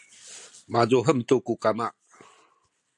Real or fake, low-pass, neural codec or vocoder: real; 10.8 kHz; none